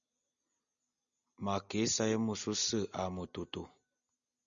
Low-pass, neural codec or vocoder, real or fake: 7.2 kHz; none; real